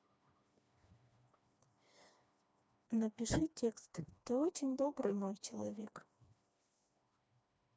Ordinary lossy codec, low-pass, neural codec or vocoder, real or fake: none; none; codec, 16 kHz, 2 kbps, FreqCodec, smaller model; fake